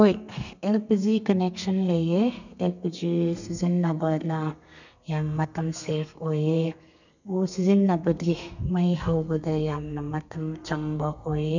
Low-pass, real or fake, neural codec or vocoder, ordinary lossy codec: 7.2 kHz; fake; codec, 32 kHz, 1.9 kbps, SNAC; none